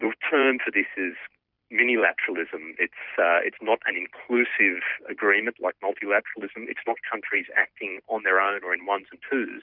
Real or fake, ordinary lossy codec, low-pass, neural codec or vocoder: fake; Opus, 64 kbps; 5.4 kHz; vocoder, 44.1 kHz, 128 mel bands every 256 samples, BigVGAN v2